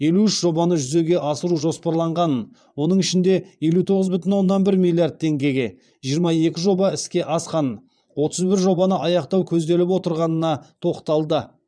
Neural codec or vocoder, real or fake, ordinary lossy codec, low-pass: vocoder, 22.05 kHz, 80 mel bands, Vocos; fake; none; 9.9 kHz